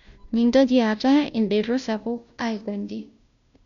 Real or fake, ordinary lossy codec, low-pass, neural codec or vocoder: fake; MP3, 96 kbps; 7.2 kHz; codec, 16 kHz, 0.5 kbps, FunCodec, trained on Chinese and English, 25 frames a second